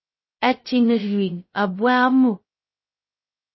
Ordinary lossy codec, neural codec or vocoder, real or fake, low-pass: MP3, 24 kbps; codec, 16 kHz, 0.2 kbps, FocalCodec; fake; 7.2 kHz